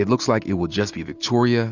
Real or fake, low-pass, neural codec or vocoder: real; 7.2 kHz; none